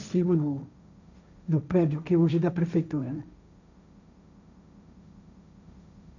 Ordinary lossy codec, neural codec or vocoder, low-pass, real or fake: none; codec, 16 kHz, 1.1 kbps, Voila-Tokenizer; 7.2 kHz; fake